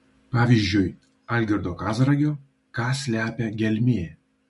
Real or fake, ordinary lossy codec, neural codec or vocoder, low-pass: real; MP3, 48 kbps; none; 14.4 kHz